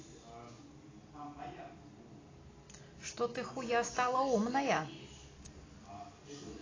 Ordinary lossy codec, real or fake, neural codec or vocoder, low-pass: AAC, 32 kbps; real; none; 7.2 kHz